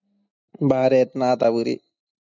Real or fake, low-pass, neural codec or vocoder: real; 7.2 kHz; none